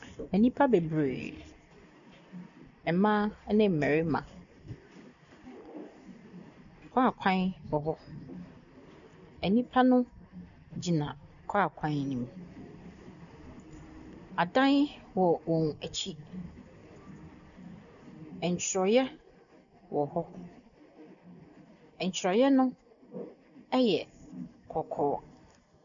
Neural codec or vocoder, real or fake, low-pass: none; real; 7.2 kHz